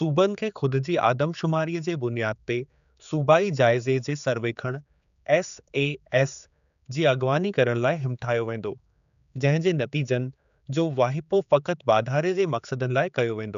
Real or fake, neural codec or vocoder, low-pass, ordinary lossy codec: fake; codec, 16 kHz, 4 kbps, X-Codec, HuBERT features, trained on general audio; 7.2 kHz; none